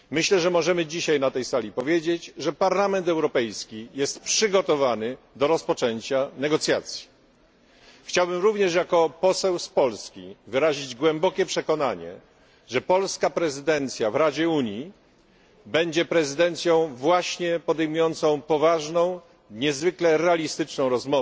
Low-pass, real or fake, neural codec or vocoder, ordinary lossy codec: none; real; none; none